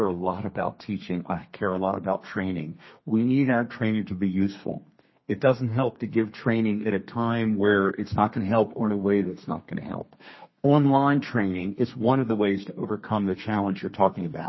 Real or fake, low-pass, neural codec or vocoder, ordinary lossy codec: fake; 7.2 kHz; codec, 32 kHz, 1.9 kbps, SNAC; MP3, 24 kbps